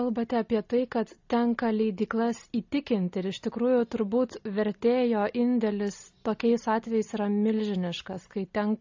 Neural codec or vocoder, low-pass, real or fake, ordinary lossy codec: none; 7.2 kHz; real; AAC, 48 kbps